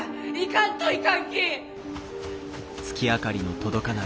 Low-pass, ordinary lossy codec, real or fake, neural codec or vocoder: none; none; real; none